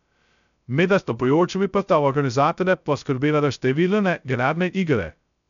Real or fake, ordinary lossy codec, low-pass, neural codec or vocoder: fake; none; 7.2 kHz; codec, 16 kHz, 0.2 kbps, FocalCodec